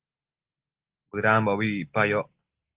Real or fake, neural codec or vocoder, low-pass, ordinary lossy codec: real; none; 3.6 kHz; Opus, 16 kbps